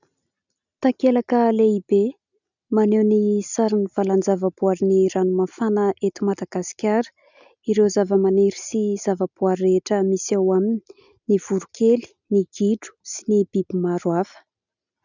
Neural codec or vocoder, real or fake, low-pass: none; real; 7.2 kHz